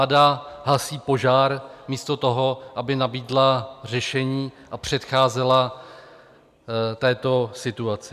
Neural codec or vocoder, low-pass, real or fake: none; 14.4 kHz; real